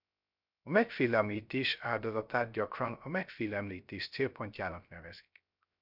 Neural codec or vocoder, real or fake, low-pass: codec, 16 kHz, 0.3 kbps, FocalCodec; fake; 5.4 kHz